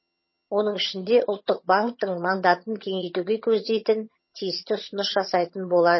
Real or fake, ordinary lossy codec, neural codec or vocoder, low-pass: fake; MP3, 24 kbps; vocoder, 22.05 kHz, 80 mel bands, HiFi-GAN; 7.2 kHz